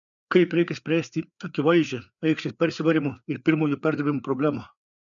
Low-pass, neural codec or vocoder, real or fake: 7.2 kHz; codec, 16 kHz, 4 kbps, FreqCodec, larger model; fake